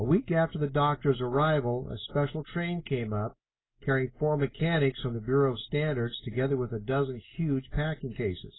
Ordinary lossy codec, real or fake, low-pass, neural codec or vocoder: AAC, 16 kbps; real; 7.2 kHz; none